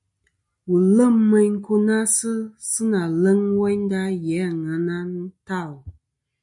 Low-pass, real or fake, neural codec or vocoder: 10.8 kHz; real; none